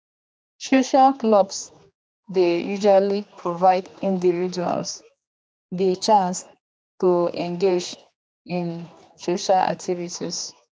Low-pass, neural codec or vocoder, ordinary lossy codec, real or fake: none; codec, 16 kHz, 2 kbps, X-Codec, HuBERT features, trained on general audio; none; fake